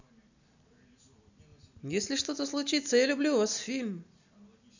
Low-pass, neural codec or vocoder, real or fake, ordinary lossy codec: 7.2 kHz; none; real; none